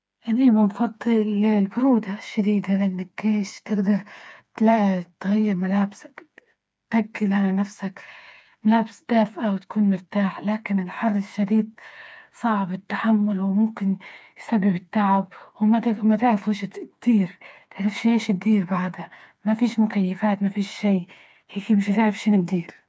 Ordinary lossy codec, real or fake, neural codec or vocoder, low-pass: none; fake; codec, 16 kHz, 4 kbps, FreqCodec, smaller model; none